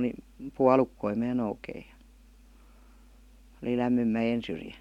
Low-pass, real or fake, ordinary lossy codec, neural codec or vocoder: 19.8 kHz; real; MP3, 96 kbps; none